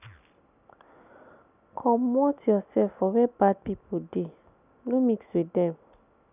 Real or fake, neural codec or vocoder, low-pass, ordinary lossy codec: real; none; 3.6 kHz; none